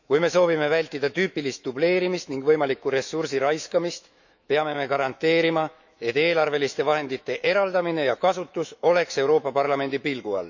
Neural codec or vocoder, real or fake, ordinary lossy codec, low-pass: autoencoder, 48 kHz, 128 numbers a frame, DAC-VAE, trained on Japanese speech; fake; none; 7.2 kHz